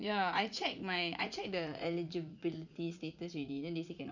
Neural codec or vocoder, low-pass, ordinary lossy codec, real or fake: vocoder, 44.1 kHz, 80 mel bands, Vocos; 7.2 kHz; none; fake